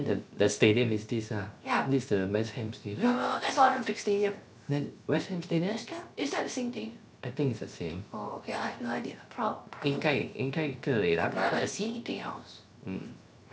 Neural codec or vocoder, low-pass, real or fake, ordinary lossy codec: codec, 16 kHz, 0.7 kbps, FocalCodec; none; fake; none